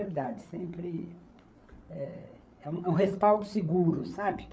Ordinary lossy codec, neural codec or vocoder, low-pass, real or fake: none; codec, 16 kHz, 8 kbps, FreqCodec, larger model; none; fake